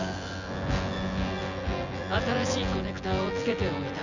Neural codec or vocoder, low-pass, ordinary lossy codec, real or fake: vocoder, 24 kHz, 100 mel bands, Vocos; 7.2 kHz; none; fake